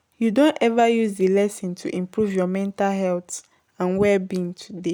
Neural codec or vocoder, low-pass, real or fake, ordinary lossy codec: none; none; real; none